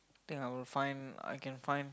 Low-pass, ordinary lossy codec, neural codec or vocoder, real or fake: none; none; none; real